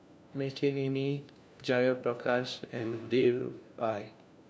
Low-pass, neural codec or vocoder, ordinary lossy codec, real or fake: none; codec, 16 kHz, 1 kbps, FunCodec, trained on LibriTTS, 50 frames a second; none; fake